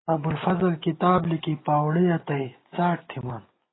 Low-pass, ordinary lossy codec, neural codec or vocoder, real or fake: 7.2 kHz; AAC, 16 kbps; none; real